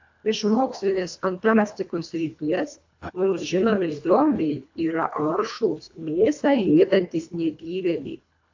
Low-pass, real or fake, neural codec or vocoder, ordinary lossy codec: 7.2 kHz; fake; codec, 24 kHz, 1.5 kbps, HILCodec; AAC, 48 kbps